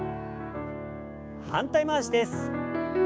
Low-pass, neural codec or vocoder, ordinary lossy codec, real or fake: none; codec, 16 kHz, 6 kbps, DAC; none; fake